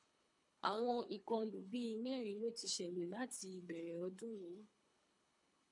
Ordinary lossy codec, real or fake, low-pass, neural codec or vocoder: MP3, 64 kbps; fake; 10.8 kHz; codec, 24 kHz, 3 kbps, HILCodec